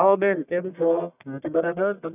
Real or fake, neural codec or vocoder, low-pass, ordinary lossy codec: fake; codec, 44.1 kHz, 1.7 kbps, Pupu-Codec; 3.6 kHz; none